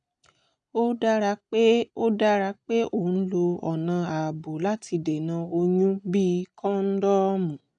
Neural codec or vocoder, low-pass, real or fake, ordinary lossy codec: none; 9.9 kHz; real; none